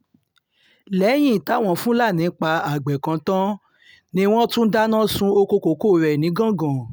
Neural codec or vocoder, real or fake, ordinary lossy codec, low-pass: none; real; none; none